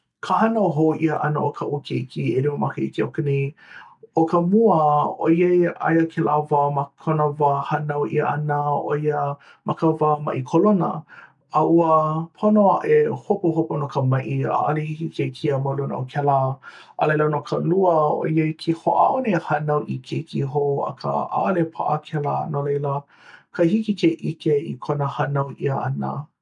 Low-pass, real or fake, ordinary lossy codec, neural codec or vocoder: 10.8 kHz; real; none; none